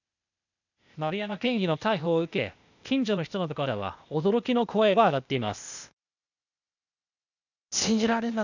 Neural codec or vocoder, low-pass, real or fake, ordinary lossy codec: codec, 16 kHz, 0.8 kbps, ZipCodec; 7.2 kHz; fake; none